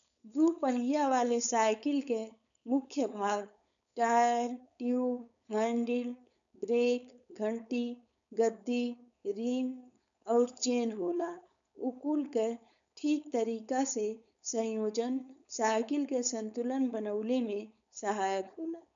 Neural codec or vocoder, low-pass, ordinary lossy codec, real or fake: codec, 16 kHz, 4.8 kbps, FACodec; 7.2 kHz; none; fake